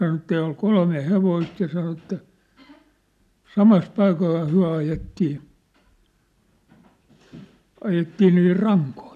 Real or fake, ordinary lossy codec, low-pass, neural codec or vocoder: real; none; 14.4 kHz; none